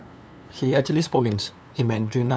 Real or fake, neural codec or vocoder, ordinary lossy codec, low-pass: fake; codec, 16 kHz, 2 kbps, FunCodec, trained on LibriTTS, 25 frames a second; none; none